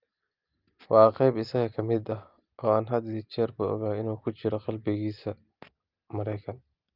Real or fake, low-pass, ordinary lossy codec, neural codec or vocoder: real; 5.4 kHz; Opus, 32 kbps; none